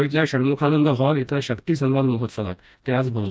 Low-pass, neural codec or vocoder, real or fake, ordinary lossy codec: none; codec, 16 kHz, 1 kbps, FreqCodec, smaller model; fake; none